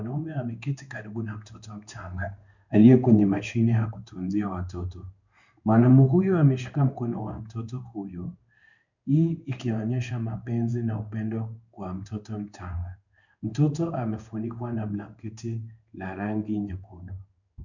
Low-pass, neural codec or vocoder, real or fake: 7.2 kHz; codec, 16 kHz in and 24 kHz out, 1 kbps, XY-Tokenizer; fake